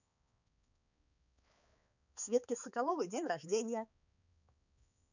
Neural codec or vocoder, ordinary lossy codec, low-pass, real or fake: codec, 16 kHz, 4 kbps, X-Codec, HuBERT features, trained on balanced general audio; none; 7.2 kHz; fake